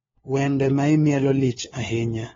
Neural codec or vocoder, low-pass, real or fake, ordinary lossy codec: codec, 16 kHz, 4 kbps, FunCodec, trained on LibriTTS, 50 frames a second; 7.2 kHz; fake; AAC, 24 kbps